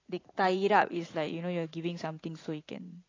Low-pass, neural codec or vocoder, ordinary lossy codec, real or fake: 7.2 kHz; vocoder, 44.1 kHz, 80 mel bands, Vocos; AAC, 32 kbps; fake